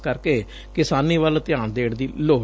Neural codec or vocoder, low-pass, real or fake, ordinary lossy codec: none; none; real; none